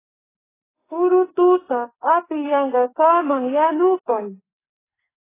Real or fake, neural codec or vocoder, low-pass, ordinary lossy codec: fake; codec, 44.1 kHz, 2.6 kbps, SNAC; 3.6 kHz; AAC, 16 kbps